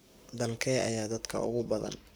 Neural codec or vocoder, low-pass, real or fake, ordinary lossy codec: codec, 44.1 kHz, 7.8 kbps, Pupu-Codec; none; fake; none